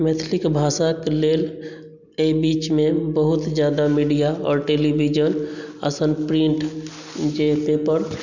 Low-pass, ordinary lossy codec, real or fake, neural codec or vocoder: 7.2 kHz; none; real; none